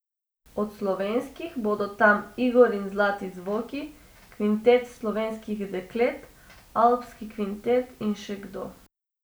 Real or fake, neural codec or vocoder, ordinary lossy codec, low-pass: real; none; none; none